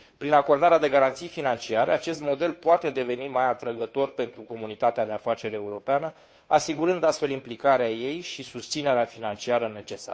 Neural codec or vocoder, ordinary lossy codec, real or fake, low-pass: codec, 16 kHz, 2 kbps, FunCodec, trained on Chinese and English, 25 frames a second; none; fake; none